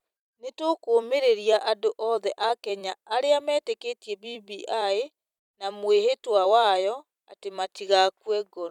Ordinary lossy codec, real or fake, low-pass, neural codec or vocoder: none; fake; 19.8 kHz; vocoder, 44.1 kHz, 128 mel bands every 256 samples, BigVGAN v2